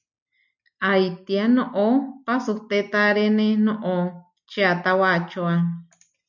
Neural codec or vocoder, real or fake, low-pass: none; real; 7.2 kHz